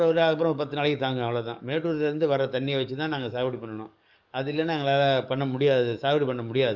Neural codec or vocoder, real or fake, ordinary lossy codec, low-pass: codec, 44.1 kHz, 7.8 kbps, DAC; fake; none; 7.2 kHz